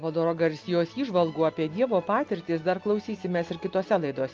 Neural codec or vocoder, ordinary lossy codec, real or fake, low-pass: none; Opus, 24 kbps; real; 7.2 kHz